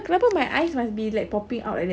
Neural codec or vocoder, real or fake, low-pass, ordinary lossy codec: none; real; none; none